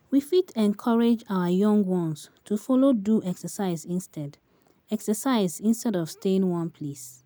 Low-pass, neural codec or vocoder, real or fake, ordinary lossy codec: none; none; real; none